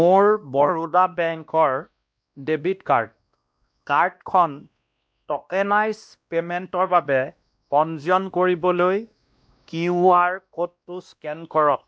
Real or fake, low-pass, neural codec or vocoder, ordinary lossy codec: fake; none; codec, 16 kHz, 1 kbps, X-Codec, WavLM features, trained on Multilingual LibriSpeech; none